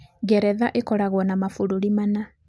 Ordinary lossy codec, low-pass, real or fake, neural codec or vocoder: none; none; real; none